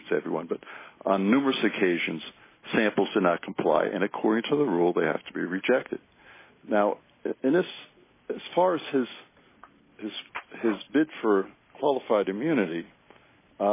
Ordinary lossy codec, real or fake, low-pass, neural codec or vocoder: MP3, 16 kbps; real; 3.6 kHz; none